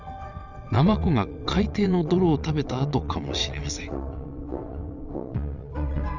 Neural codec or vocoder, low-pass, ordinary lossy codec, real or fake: vocoder, 22.05 kHz, 80 mel bands, WaveNeXt; 7.2 kHz; none; fake